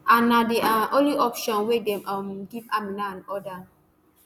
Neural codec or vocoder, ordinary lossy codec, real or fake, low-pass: none; none; real; none